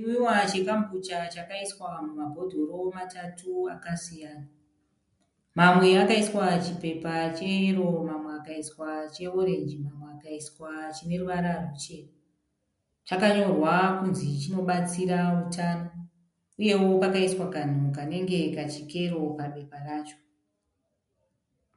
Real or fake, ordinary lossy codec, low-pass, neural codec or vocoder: real; MP3, 64 kbps; 10.8 kHz; none